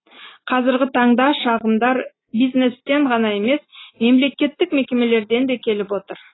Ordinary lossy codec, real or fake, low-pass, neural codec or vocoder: AAC, 16 kbps; real; 7.2 kHz; none